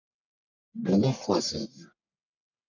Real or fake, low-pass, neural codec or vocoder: fake; 7.2 kHz; codec, 44.1 kHz, 1.7 kbps, Pupu-Codec